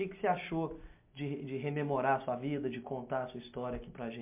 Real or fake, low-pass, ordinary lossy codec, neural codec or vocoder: real; 3.6 kHz; MP3, 32 kbps; none